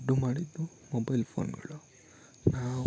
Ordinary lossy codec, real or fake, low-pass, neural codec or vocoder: none; real; none; none